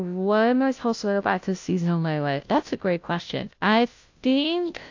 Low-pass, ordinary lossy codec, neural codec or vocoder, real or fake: 7.2 kHz; AAC, 48 kbps; codec, 16 kHz, 0.5 kbps, FunCodec, trained on Chinese and English, 25 frames a second; fake